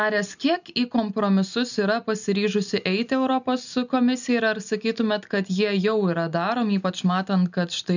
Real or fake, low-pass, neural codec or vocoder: real; 7.2 kHz; none